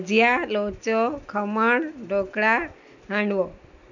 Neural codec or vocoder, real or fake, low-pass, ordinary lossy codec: none; real; 7.2 kHz; none